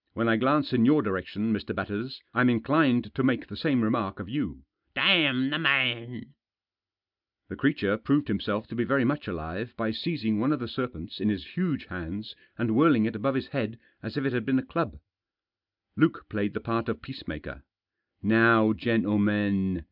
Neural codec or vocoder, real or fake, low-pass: none; real; 5.4 kHz